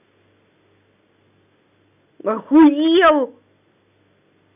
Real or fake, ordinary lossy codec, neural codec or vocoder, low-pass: real; none; none; 3.6 kHz